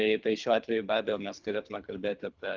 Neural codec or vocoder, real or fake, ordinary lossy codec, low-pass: codec, 24 kHz, 3 kbps, HILCodec; fake; Opus, 32 kbps; 7.2 kHz